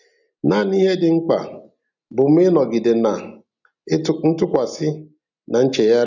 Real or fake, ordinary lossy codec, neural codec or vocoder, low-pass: real; none; none; 7.2 kHz